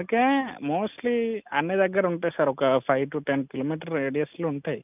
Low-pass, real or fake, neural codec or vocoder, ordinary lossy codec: 3.6 kHz; real; none; none